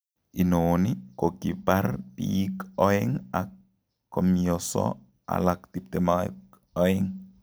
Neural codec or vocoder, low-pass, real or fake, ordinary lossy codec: none; none; real; none